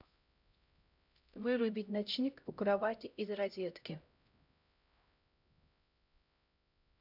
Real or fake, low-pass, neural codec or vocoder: fake; 5.4 kHz; codec, 16 kHz, 0.5 kbps, X-Codec, HuBERT features, trained on LibriSpeech